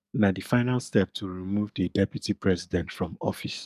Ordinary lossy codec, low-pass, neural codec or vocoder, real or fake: none; 14.4 kHz; codec, 44.1 kHz, 7.8 kbps, DAC; fake